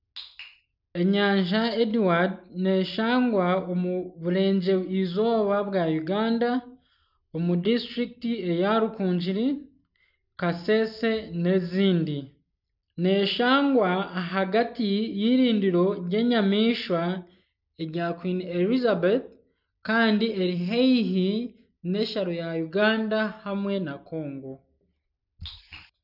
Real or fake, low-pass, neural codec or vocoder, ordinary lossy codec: real; 5.4 kHz; none; none